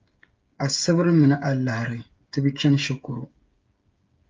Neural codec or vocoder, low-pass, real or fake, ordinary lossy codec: codec, 16 kHz, 8 kbps, FreqCodec, smaller model; 7.2 kHz; fake; Opus, 24 kbps